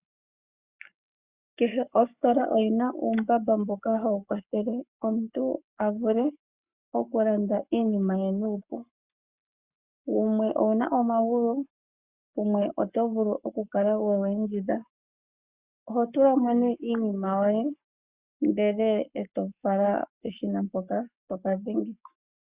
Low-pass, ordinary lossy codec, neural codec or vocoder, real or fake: 3.6 kHz; Opus, 64 kbps; codec, 44.1 kHz, 7.8 kbps, Pupu-Codec; fake